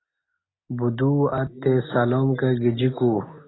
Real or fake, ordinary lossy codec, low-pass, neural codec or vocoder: real; AAC, 16 kbps; 7.2 kHz; none